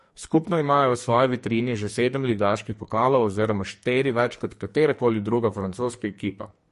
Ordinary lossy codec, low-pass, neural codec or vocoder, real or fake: MP3, 48 kbps; 14.4 kHz; codec, 44.1 kHz, 2.6 kbps, SNAC; fake